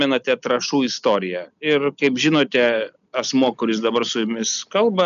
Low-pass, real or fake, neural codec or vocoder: 7.2 kHz; real; none